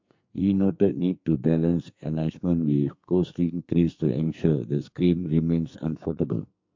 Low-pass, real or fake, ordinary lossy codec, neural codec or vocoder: 7.2 kHz; fake; MP3, 48 kbps; codec, 44.1 kHz, 2.6 kbps, SNAC